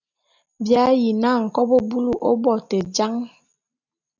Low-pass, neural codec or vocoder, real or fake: 7.2 kHz; none; real